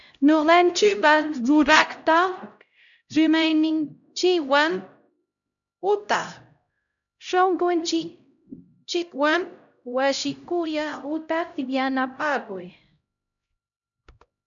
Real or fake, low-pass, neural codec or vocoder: fake; 7.2 kHz; codec, 16 kHz, 0.5 kbps, X-Codec, HuBERT features, trained on LibriSpeech